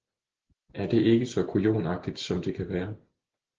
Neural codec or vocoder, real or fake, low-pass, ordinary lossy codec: none; real; 7.2 kHz; Opus, 16 kbps